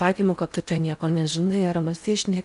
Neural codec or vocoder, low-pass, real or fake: codec, 16 kHz in and 24 kHz out, 0.6 kbps, FocalCodec, streaming, 4096 codes; 10.8 kHz; fake